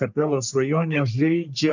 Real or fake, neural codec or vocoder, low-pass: fake; codec, 44.1 kHz, 3.4 kbps, Pupu-Codec; 7.2 kHz